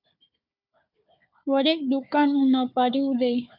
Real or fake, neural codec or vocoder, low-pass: fake; codec, 16 kHz, 4 kbps, FunCodec, trained on Chinese and English, 50 frames a second; 5.4 kHz